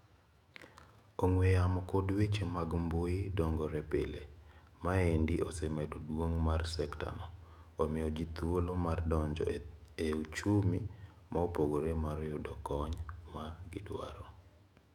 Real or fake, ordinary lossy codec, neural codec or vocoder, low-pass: fake; none; autoencoder, 48 kHz, 128 numbers a frame, DAC-VAE, trained on Japanese speech; 19.8 kHz